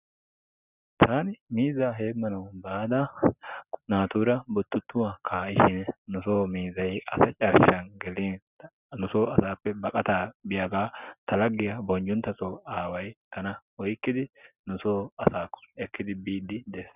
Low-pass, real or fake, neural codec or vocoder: 3.6 kHz; real; none